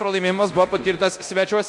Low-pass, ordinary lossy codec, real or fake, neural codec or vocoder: 10.8 kHz; MP3, 64 kbps; fake; codec, 24 kHz, 0.9 kbps, DualCodec